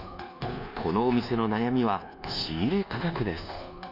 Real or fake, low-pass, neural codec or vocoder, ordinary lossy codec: fake; 5.4 kHz; codec, 24 kHz, 1.2 kbps, DualCodec; none